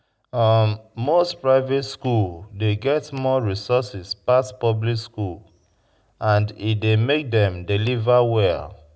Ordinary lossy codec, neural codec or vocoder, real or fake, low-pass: none; none; real; none